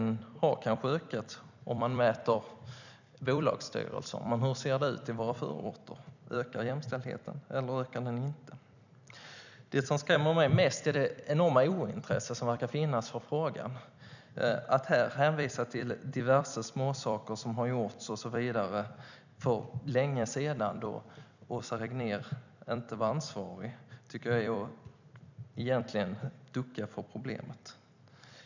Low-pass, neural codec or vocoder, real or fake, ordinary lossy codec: 7.2 kHz; vocoder, 44.1 kHz, 128 mel bands every 256 samples, BigVGAN v2; fake; none